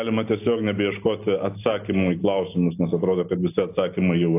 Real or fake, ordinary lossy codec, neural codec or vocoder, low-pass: real; AAC, 24 kbps; none; 3.6 kHz